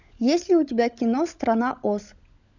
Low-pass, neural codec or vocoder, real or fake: 7.2 kHz; codec, 16 kHz, 16 kbps, FunCodec, trained on LibriTTS, 50 frames a second; fake